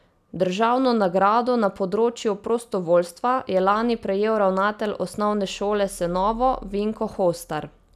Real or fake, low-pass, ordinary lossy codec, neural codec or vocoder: real; 14.4 kHz; none; none